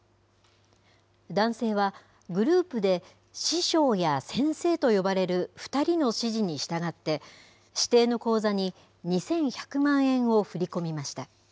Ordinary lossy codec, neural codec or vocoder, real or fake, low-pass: none; none; real; none